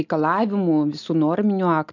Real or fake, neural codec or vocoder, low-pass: real; none; 7.2 kHz